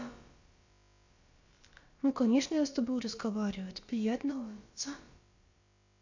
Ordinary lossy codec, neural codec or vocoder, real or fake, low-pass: Opus, 64 kbps; codec, 16 kHz, about 1 kbps, DyCAST, with the encoder's durations; fake; 7.2 kHz